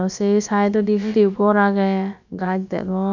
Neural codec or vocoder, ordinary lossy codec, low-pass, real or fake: codec, 16 kHz, about 1 kbps, DyCAST, with the encoder's durations; none; 7.2 kHz; fake